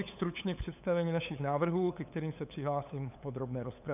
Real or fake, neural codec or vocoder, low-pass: fake; codec, 16 kHz, 8 kbps, FunCodec, trained on LibriTTS, 25 frames a second; 3.6 kHz